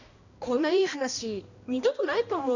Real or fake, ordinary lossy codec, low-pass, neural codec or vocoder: fake; none; 7.2 kHz; codec, 16 kHz, 1 kbps, X-Codec, HuBERT features, trained on balanced general audio